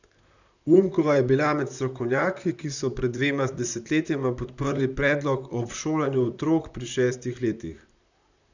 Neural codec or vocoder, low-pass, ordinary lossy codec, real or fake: vocoder, 44.1 kHz, 128 mel bands, Pupu-Vocoder; 7.2 kHz; none; fake